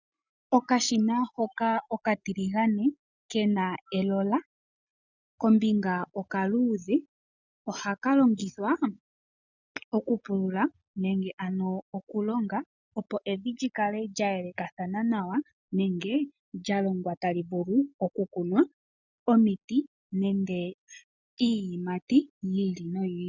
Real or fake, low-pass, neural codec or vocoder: real; 7.2 kHz; none